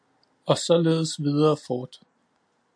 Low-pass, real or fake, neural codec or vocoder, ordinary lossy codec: 9.9 kHz; real; none; AAC, 64 kbps